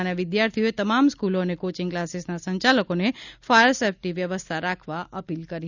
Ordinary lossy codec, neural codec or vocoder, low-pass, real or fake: none; none; 7.2 kHz; real